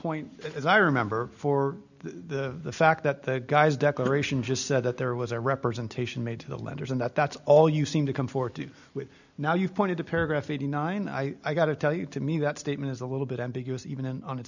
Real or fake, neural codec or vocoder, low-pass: real; none; 7.2 kHz